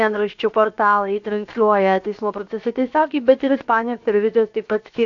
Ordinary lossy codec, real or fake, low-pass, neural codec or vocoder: AAC, 64 kbps; fake; 7.2 kHz; codec, 16 kHz, 0.7 kbps, FocalCodec